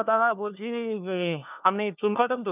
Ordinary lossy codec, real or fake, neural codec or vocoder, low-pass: none; fake; codec, 16 kHz, 1 kbps, FunCodec, trained on LibriTTS, 50 frames a second; 3.6 kHz